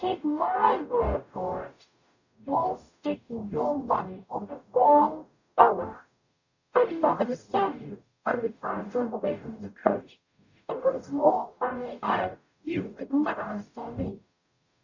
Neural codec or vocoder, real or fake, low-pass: codec, 44.1 kHz, 0.9 kbps, DAC; fake; 7.2 kHz